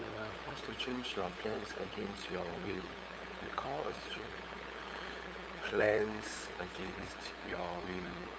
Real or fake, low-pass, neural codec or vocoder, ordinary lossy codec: fake; none; codec, 16 kHz, 8 kbps, FunCodec, trained on LibriTTS, 25 frames a second; none